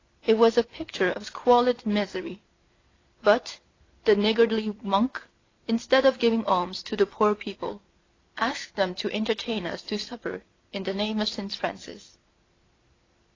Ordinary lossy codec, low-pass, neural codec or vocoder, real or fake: AAC, 32 kbps; 7.2 kHz; vocoder, 44.1 kHz, 128 mel bands every 256 samples, BigVGAN v2; fake